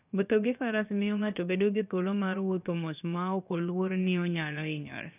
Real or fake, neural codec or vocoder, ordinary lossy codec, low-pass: fake; codec, 16 kHz, about 1 kbps, DyCAST, with the encoder's durations; none; 3.6 kHz